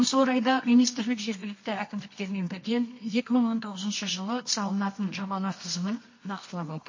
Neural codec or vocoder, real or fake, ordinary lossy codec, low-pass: codec, 24 kHz, 0.9 kbps, WavTokenizer, medium music audio release; fake; MP3, 32 kbps; 7.2 kHz